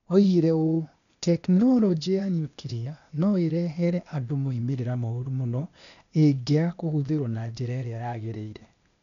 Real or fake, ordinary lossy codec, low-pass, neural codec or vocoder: fake; none; 7.2 kHz; codec, 16 kHz, 0.8 kbps, ZipCodec